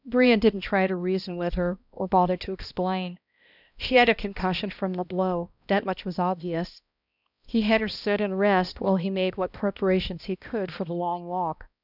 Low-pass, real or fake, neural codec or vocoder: 5.4 kHz; fake; codec, 16 kHz, 1 kbps, X-Codec, HuBERT features, trained on balanced general audio